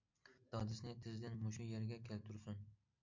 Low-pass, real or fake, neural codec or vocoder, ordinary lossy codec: 7.2 kHz; real; none; MP3, 48 kbps